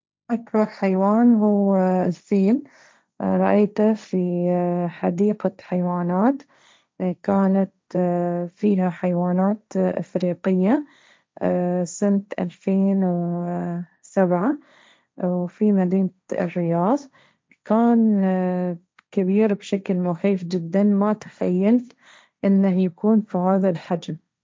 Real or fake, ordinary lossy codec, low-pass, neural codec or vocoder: fake; none; none; codec, 16 kHz, 1.1 kbps, Voila-Tokenizer